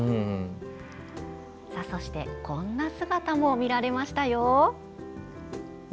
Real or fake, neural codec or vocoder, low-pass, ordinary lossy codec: real; none; none; none